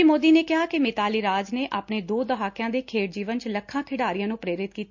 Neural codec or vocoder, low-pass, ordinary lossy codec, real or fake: none; 7.2 kHz; MP3, 48 kbps; real